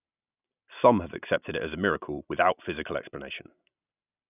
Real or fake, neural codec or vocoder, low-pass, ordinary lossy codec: real; none; 3.6 kHz; none